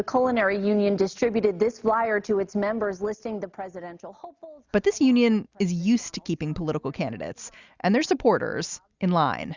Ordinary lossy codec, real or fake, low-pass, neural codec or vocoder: Opus, 64 kbps; real; 7.2 kHz; none